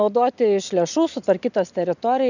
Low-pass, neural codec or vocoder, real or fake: 7.2 kHz; none; real